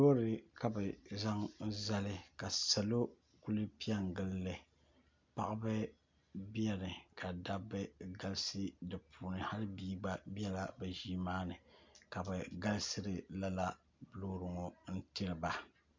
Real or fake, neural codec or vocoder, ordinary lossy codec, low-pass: real; none; MP3, 64 kbps; 7.2 kHz